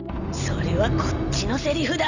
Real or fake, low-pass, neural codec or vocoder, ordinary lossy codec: real; 7.2 kHz; none; none